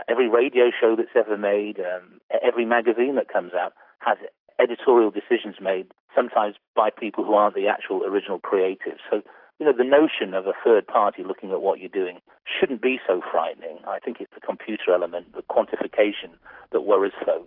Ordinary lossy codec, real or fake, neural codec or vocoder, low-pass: AAC, 48 kbps; real; none; 5.4 kHz